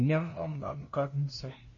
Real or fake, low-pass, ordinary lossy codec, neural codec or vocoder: fake; 7.2 kHz; MP3, 32 kbps; codec, 16 kHz, 0.5 kbps, FunCodec, trained on LibriTTS, 25 frames a second